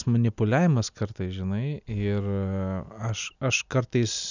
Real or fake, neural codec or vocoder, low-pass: real; none; 7.2 kHz